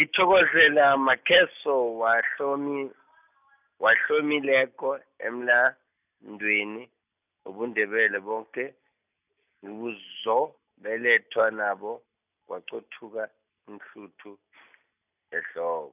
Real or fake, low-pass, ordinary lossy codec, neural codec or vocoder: real; 3.6 kHz; none; none